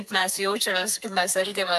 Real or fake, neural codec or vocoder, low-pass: fake; codec, 32 kHz, 1.9 kbps, SNAC; 14.4 kHz